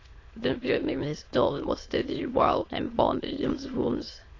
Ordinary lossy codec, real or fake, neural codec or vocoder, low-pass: AAC, 32 kbps; fake; autoencoder, 22.05 kHz, a latent of 192 numbers a frame, VITS, trained on many speakers; 7.2 kHz